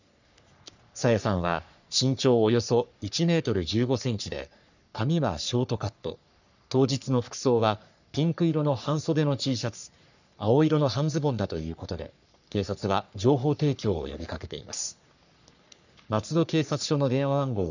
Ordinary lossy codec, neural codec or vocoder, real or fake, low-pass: none; codec, 44.1 kHz, 3.4 kbps, Pupu-Codec; fake; 7.2 kHz